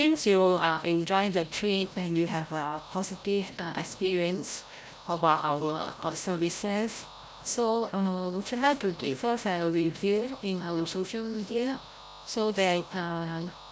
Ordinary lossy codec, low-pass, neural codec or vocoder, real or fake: none; none; codec, 16 kHz, 0.5 kbps, FreqCodec, larger model; fake